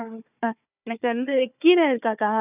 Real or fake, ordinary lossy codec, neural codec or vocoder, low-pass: fake; none; codec, 16 kHz, 4 kbps, FunCodec, trained on Chinese and English, 50 frames a second; 3.6 kHz